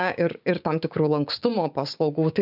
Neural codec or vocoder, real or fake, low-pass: codec, 16 kHz, 6 kbps, DAC; fake; 5.4 kHz